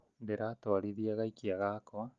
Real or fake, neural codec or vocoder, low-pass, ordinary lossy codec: real; none; 7.2 kHz; Opus, 24 kbps